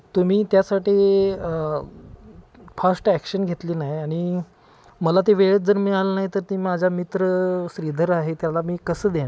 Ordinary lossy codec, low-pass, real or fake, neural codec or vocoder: none; none; real; none